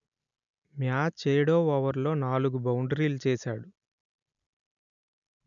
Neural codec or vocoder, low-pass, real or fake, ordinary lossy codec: none; 7.2 kHz; real; none